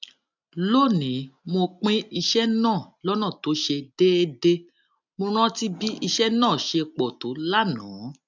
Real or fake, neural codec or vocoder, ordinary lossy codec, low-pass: real; none; none; 7.2 kHz